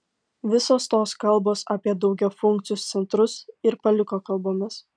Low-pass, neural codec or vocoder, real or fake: 9.9 kHz; none; real